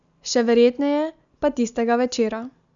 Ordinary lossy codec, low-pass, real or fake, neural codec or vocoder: none; 7.2 kHz; real; none